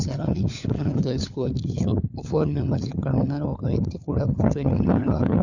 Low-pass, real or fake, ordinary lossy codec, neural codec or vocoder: 7.2 kHz; fake; none; codec, 16 kHz, 4 kbps, FunCodec, trained on LibriTTS, 50 frames a second